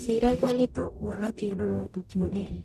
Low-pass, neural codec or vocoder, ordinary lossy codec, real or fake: 14.4 kHz; codec, 44.1 kHz, 0.9 kbps, DAC; none; fake